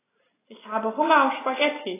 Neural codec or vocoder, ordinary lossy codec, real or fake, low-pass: none; AAC, 16 kbps; real; 3.6 kHz